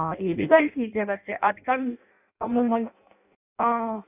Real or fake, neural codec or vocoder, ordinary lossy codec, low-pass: fake; codec, 16 kHz in and 24 kHz out, 0.6 kbps, FireRedTTS-2 codec; none; 3.6 kHz